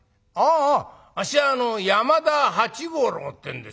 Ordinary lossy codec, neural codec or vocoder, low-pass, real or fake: none; none; none; real